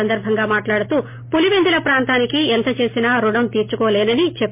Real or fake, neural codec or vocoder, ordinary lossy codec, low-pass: real; none; MP3, 24 kbps; 3.6 kHz